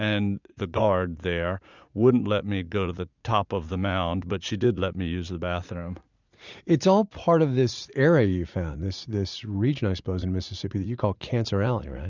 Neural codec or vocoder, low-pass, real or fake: vocoder, 44.1 kHz, 80 mel bands, Vocos; 7.2 kHz; fake